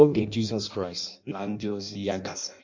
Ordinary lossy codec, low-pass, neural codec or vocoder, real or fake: MP3, 64 kbps; 7.2 kHz; codec, 16 kHz in and 24 kHz out, 0.6 kbps, FireRedTTS-2 codec; fake